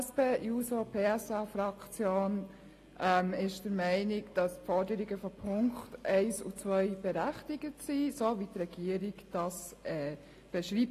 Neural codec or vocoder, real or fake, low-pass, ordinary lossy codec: none; real; 14.4 kHz; AAC, 48 kbps